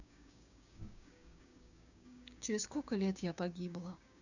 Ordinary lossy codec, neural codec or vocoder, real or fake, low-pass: none; codec, 16 kHz, 6 kbps, DAC; fake; 7.2 kHz